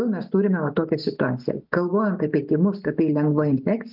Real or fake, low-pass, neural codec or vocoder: real; 5.4 kHz; none